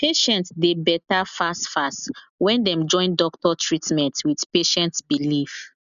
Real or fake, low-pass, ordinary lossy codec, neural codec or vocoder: real; 7.2 kHz; none; none